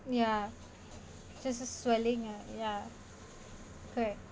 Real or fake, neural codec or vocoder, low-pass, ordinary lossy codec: real; none; none; none